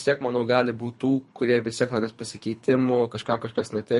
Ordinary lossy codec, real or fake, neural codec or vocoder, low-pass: MP3, 48 kbps; fake; codec, 24 kHz, 3 kbps, HILCodec; 10.8 kHz